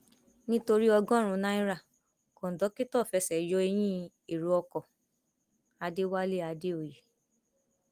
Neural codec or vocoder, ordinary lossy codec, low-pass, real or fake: none; Opus, 24 kbps; 14.4 kHz; real